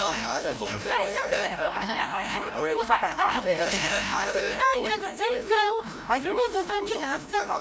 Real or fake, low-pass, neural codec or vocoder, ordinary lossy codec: fake; none; codec, 16 kHz, 0.5 kbps, FreqCodec, larger model; none